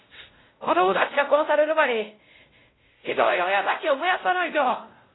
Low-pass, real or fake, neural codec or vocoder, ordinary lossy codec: 7.2 kHz; fake; codec, 16 kHz, 0.5 kbps, X-Codec, WavLM features, trained on Multilingual LibriSpeech; AAC, 16 kbps